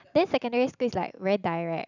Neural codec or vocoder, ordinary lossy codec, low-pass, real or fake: none; none; 7.2 kHz; real